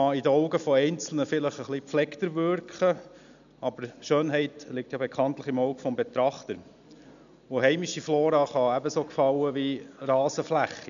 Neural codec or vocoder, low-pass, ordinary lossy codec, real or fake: none; 7.2 kHz; none; real